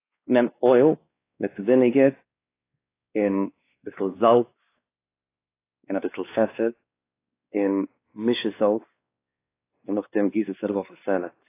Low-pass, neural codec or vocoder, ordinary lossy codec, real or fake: 3.6 kHz; codec, 16 kHz, 2 kbps, X-Codec, WavLM features, trained on Multilingual LibriSpeech; AAC, 24 kbps; fake